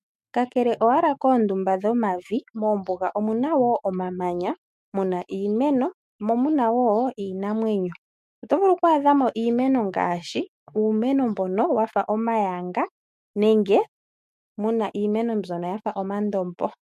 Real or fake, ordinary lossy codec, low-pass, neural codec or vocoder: fake; MP3, 64 kbps; 14.4 kHz; autoencoder, 48 kHz, 128 numbers a frame, DAC-VAE, trained on Japanese speech